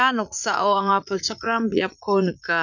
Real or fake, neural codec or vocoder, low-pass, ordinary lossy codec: fake; vocoder, 44.1 kHz, 80 mel bands, Vocos; 7.2 kHz; none